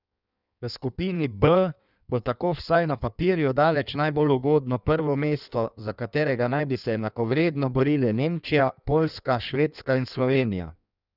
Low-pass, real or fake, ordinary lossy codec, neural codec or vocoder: 5.4 kHz; fake; none; codec, 16 kHz in and 24 kHz out, 1.1 kbps, FireRedTTS-2 codec